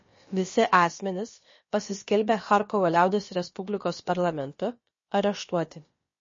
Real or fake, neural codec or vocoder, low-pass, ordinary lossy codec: fake; codec, 16 kHz, about 1 kbps, DyCAST, with the encoder's durations; 7.2 kHz; MP3, 32 kbps